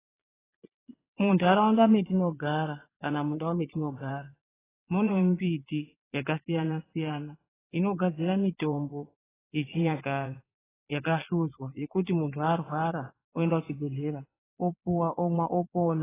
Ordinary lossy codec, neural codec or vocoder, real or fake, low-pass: AAC, 16 kbps; vocoder, 22.05 kHz, 80 mel bands, Vocos; fake; 3.6 kHz